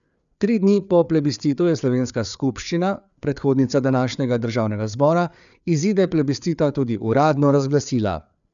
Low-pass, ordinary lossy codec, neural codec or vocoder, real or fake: 7.2 kHz; none; codec, 16 kHz, 4 kbps, FreqCodec, larger model; fake